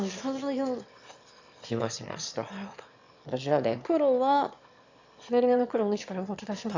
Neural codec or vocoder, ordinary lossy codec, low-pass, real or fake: autoencoder, 22.05 kHz, a latent of 192 numbers a frame, VITS, trained on one speaker; AAC, 48 kbps; 7.2 kHz; fake